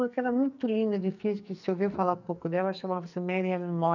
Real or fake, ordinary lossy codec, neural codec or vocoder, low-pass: fake; none; codec, 44.1 kHz, 2.6 kbps, SNAC; 7.2 kHz